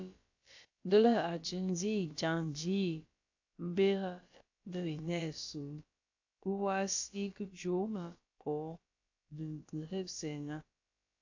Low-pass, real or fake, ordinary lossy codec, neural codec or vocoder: 7.2 kHz; fake; AAC, 48 kbps; codec, 16 kHz, about 1 kbps, DyCAST, with the encoder's durations